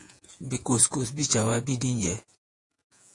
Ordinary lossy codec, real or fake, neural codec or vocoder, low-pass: AAC, 48 kbps; fake; vocoder, 48 kHz, 128 mel bands, Vocos; 10.8 kHz